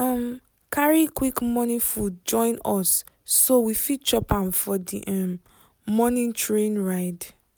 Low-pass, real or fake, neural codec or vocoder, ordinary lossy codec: none; real; none; none